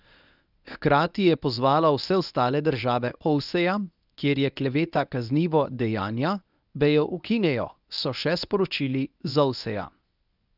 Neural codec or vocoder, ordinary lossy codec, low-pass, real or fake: codec, 24 kHz, 0.9 kbps, WavTokenizer, medium speech release version 1; none; 5.4 kHz; fake